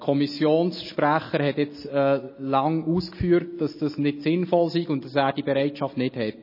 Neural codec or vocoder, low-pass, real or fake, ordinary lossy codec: none; 5.4 kHz; real; MP3, 24 kbps